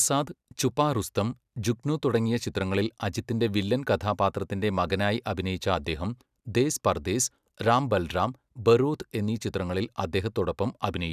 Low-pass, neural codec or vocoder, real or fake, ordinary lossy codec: 14.4 kHz; none; real; none